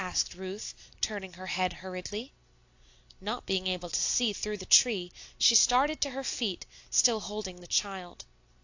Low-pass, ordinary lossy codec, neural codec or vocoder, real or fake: 7.2 kHz; AAC, 48 kbps; none; real